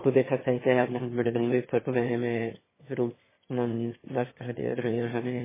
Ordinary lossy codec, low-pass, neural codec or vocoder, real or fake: MP3, 16 kbps; 3.6 kHz; autoencoder, 22.05 kHz, a latent of 192 numbers a frame, VITS, trained on one speaker; fake